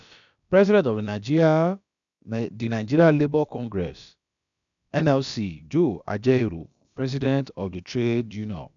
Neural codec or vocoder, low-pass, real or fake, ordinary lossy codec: codec, 16 kHz, about 1 kbps, DyCAST, with the encoder's durations; 7.2 kHz; fake; none